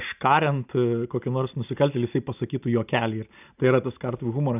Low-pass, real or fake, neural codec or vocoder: 3.6 kHz; real; none